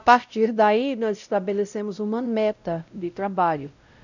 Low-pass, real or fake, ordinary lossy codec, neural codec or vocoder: 7.2 kHz; fake; none; codec, 16 kHz, 0.5 kbps, X-Codec, WavLM features, trained on Multilingual LibriSpeech